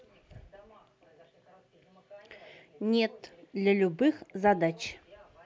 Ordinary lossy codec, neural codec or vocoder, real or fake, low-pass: none; none; real; none